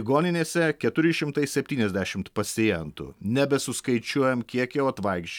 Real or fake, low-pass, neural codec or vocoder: real; 19.8 kHz; none